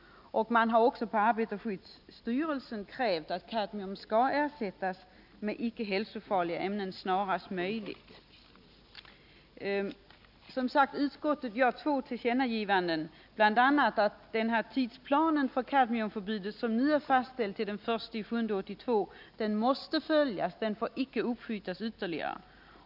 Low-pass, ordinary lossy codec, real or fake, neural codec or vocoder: 5.4 kHz; none; real; none